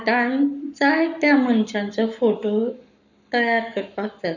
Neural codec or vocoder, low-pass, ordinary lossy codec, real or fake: codec, 44.1 kHz, 7.8 kbps, Pupu-Codec; 7.2 kHz; none; fake